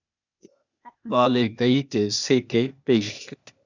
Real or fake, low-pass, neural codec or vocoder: fake; 7.2 kHz; codec, 16 kHz, 0.8 kbps, ZipCodec